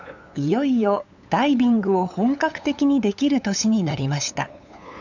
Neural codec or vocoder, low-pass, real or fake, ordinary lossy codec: codec, 16 kHz, 8 kbps, FunCodec, trained on LibriTTS, 25 frames a second; 7.2 kHz; fake; none